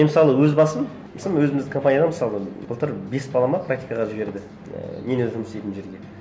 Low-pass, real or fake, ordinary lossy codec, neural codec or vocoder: none; real; none; none